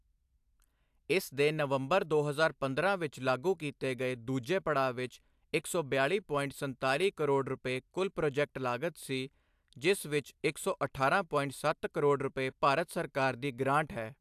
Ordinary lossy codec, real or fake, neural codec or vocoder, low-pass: AAC, 96 kbps; real; none; 14.4 kHz